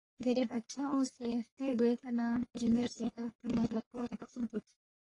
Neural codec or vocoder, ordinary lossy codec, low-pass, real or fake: codec, 44.1 kHz, 1.7 kbps, Pupu-Codec; AAC, 32 kbps; 10.8 kHz; fake